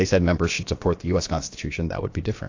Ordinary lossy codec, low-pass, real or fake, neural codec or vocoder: AAC, 48 kbps; 7.2 kHz; fake; codec, 16 kHz, about 1 kbps, DyCAST, with the encoder's durations